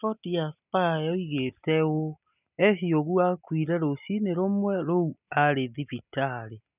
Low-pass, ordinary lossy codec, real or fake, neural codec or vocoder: 3.6 kHz; none; real; none